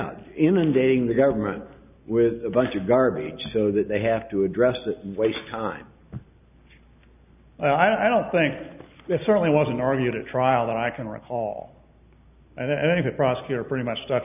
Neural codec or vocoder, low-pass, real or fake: none; 3.6 kHz; real